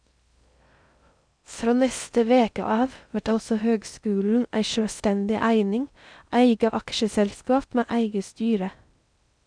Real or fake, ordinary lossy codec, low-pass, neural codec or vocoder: fake; none; 9.9 kHz; codec, 16 kHz in and 24 kHz out, 0.6 kbps, FocalCodec, streaming, 2048 codes